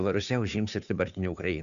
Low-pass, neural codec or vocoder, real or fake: 7.2 kHz; codec, 16 kHz, 2 kbps, FunCodec, trained on Chinese and English, 25 frames a second; fake